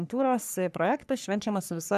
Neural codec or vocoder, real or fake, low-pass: codec, 44.1 kHz, 3.4 kbps, Pupu-Codec; fake; 14.4 kHz